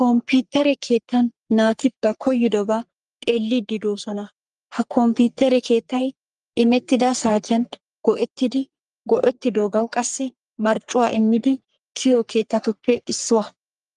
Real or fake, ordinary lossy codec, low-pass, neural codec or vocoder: fake; Opus, 32 kbps; 10.8 kHz; codec, 44.1 kHz, 3.4 kbps, Pupu-Codec